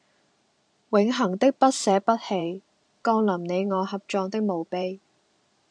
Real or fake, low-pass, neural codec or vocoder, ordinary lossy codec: fake; 9.9 kHz; vocoder, 44.1 kHz, 128 mel bands every 512 samples, BigVGAN v2; AAC, 64 kbps